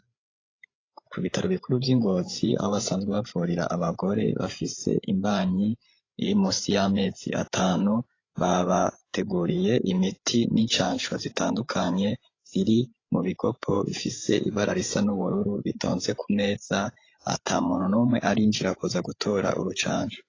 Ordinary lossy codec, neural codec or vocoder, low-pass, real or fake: AAC, 32 kbps; codec, 16 kHz, 8 kbps, FreqCodec, larger model; 7.2 kHz; fake